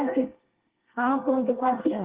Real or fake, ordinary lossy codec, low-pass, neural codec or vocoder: fake; Opus, 16 kbps; 3.6 kHz; codec, 24 kHz, 1 kbps, SNAC